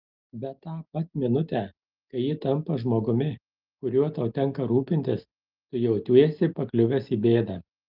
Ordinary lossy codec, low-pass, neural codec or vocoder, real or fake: Opus, 32 kbps; 5.4 kHz; none; real